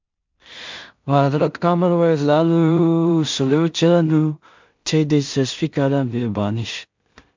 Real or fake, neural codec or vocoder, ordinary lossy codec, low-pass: fake; codec, 16 kHz in and 24 kHz out, 0.4 kbps, LongCat-Audio-Codec, two codebook decoder; MP3, 64 kbps; 7.2 kHz